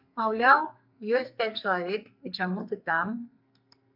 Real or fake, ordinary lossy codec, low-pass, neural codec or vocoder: fake; MP3, 48 kbps; 5.4 kHz; codec, 44.1 kHz, 2.6 kbps, SNAC